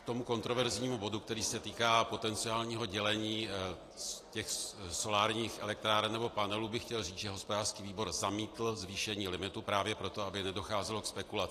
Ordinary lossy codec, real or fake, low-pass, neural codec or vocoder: AAC, 48 kbps; real; 14.4 kHz; none